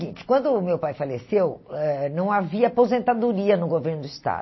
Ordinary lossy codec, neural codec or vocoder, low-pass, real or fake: MP3, 24 kbps; none; 7.2 kHz; real